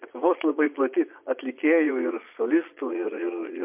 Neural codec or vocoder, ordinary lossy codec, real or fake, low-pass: vocoder, 22.05 kHz, 80 mel bands, Vocos; MP3, 32 kbps; fake; 3.6 kHz